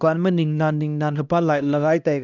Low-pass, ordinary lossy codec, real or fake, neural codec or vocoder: 7.2 kHz; none; fake; codec, 16 kHz, 1 kbps, X-Codec, HuBERT features, trained on LibriSpeech